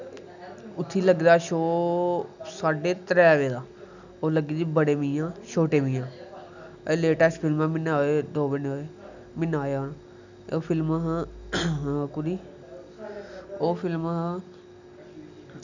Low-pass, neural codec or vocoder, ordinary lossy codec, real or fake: 7.2 kHz; none; none; real